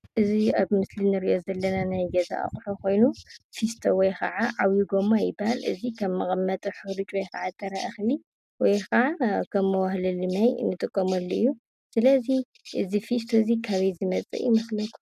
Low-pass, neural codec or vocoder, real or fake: 14.4 kHz; none; real